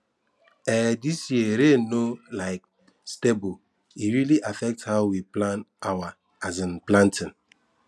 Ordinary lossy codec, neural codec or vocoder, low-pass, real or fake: none; none; none; real